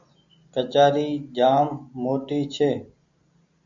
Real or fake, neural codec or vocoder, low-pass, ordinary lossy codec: real; none; 7.2 kHz; AAC, 64 kbps